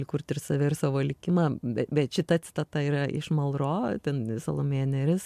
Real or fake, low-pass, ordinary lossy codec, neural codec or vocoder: real; 14.4 kHz; MP3, 96 kbps; none